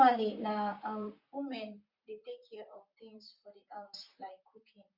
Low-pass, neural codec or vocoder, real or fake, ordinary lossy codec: 5.4 kHz; vocoder, 44.1 kHz, 128 mel bands, Pupu-Vocoder; fake; Opus, 64 kbps